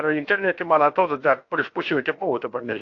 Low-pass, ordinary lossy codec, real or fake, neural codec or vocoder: 7.2 kHz; AAC, 48 kbps; fake; codec, 16 kHz, about 1 kbps, DyCAST, with the encoder's durations